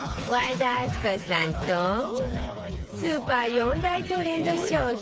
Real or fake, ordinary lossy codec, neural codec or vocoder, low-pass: fake; none; codec, 16 kHz, 4 kbps, FreqCodec, smaller model; none